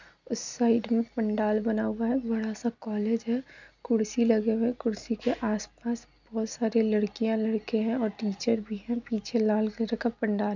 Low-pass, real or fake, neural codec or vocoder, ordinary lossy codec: 7.2 kHz; real; none; none